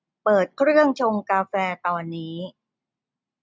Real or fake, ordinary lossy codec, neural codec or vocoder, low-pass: real; none; none; none